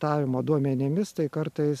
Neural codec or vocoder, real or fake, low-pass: none; real; 14.4 kHz